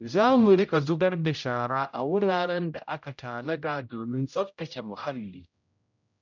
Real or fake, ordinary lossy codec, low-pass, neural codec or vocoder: fake; none; 7.2 kHz; codec, 16 kHz, 0.5 kbps, X-Codec, HuBERT features, trained on general audio